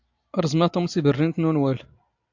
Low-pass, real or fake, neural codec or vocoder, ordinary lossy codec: 7.2 kHz; real; none; AAC, 48 kbps